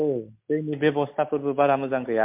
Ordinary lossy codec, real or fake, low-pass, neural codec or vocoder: MP3, 32 kbps; real; 3.6 kHz; none